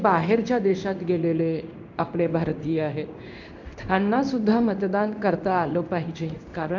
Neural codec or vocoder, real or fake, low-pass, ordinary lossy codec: codec, 16 kHz in and 24 kHz out, 1 kbps, XY-Tokenizer; fake; 7.2 kHz; none